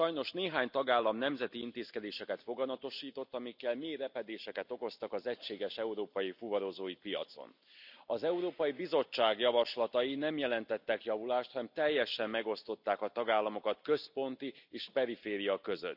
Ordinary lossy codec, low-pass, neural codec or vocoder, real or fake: none; 5.4 kHz; none; real